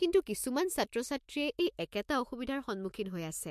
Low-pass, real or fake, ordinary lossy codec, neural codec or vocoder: 14.4 kHz; fake; MP3, 64 kbps; autoencoder, 48 kHz, 128 numbers a frame, DAC-VAE, trained on Japanese speech